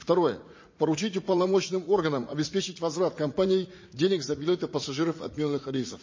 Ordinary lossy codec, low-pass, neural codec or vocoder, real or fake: MP3, 32 kbps; 7.2 kHz; vocoder, 44.1 kHz, 80 mel bands, Vocos; fake